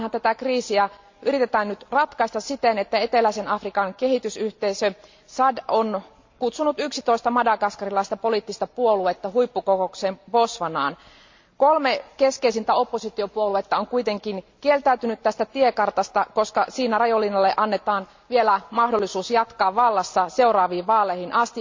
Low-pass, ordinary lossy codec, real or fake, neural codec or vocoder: 7.2 kHz; MP3, 64 kbps; real; none